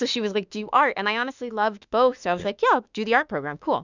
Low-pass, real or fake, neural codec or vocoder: 7.2 kHz; fake; autoencoder, 48 kHz, 32 numbers a frame, DAC-VAE, trained on Japanese speech